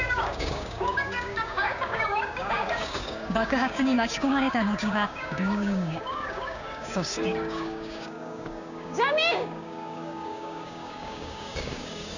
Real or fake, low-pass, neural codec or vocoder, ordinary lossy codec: fake; 7.2 kHz; codec, 16 kHz, 6 kbps, DAC; none